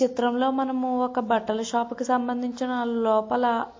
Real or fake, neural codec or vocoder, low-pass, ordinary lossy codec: real; none; 7.2 kHz; MP3, 32 kbps